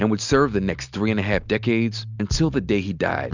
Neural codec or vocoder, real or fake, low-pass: none; real; 7.2 kHz